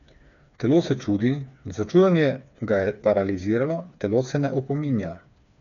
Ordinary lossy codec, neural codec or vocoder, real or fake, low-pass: none; codec, 16 kHz, 4 kbps, FreqCodec, smaller model; fake; 7.2 kHz